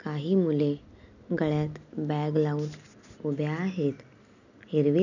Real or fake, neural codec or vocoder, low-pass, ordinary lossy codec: real; none; 7.2 kHz; none